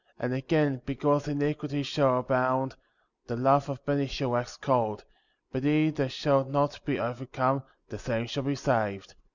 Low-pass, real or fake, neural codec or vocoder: 7.2 kHz; real; none